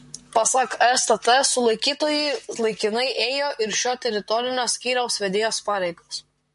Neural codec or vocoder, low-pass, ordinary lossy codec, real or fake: vocoder, 44.1 kHz, 128 mel bands, Pupu-Vocoder; 14.4 kHz; MP3, 48 kbps; fake